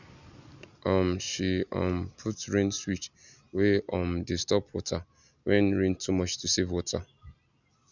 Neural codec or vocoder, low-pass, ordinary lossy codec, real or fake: none; 7.2 kHz; none; real